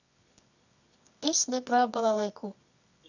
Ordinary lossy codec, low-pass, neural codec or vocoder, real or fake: none; 7.2 kHz; codec, 24 kHz, 0.9 kbps, WavTokenizer, medium music audio release; fake